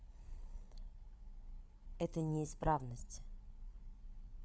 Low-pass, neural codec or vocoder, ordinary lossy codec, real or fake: none; codec, 16 kHz, 8 kbps, FreqCodec, larger model; none; fake